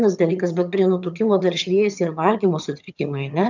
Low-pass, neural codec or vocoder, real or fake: 7.2 kHz; vocoder, 22.05 kHz, 80 mel bands, HiFi-GAN; fake